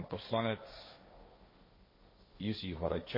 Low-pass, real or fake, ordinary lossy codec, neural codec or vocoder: 5.4 kHz; fake; MP3, 24 kbps; codec, 16 kHz, 1.1 kbps, Voila-Tokenizer